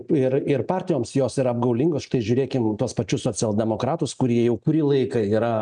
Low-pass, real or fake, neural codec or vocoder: 9.9 kHz; real; none